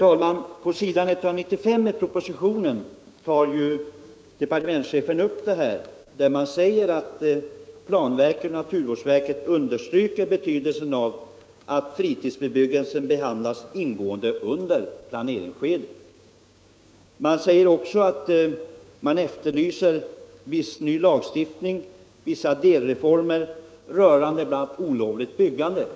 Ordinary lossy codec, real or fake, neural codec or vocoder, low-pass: none; fake; codec, 16 kHz, 6 kbps, DAC; none